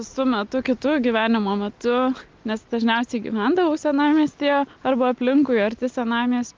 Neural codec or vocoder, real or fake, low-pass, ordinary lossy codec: none; real; 7.2 kHz; Opus, 24 kbps